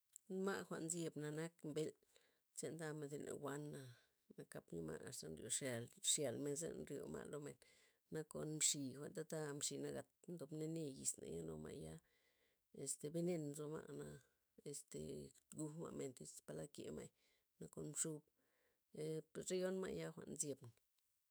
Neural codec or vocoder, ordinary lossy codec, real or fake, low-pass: autoencoder, 48 kHz, 128 numbers a frame, DAC-VAE, trained on Japanese speech; none; fake; none